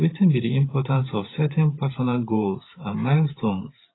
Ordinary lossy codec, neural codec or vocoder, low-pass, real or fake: AAC, 16 kbps; vocoder, 44.1 kHz, 128 mel bands every 512 samples, BigVGAN v2; 7.2 kHz; fake